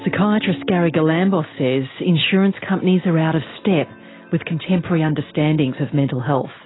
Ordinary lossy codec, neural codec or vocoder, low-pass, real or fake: AAC, 16 kbps; none; 7.2 kHz; real